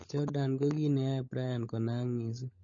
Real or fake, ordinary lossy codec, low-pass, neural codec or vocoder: fake; MP3, 32 kbps; 7.2 kHz; codec, 16 kHz, 16 kbps, FunCodec, trained on LibriTTS, 50 frames a second